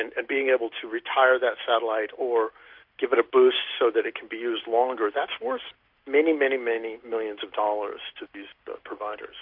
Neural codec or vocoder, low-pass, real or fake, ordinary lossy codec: none; 5.4 kHz; real; MP3, 48 kbps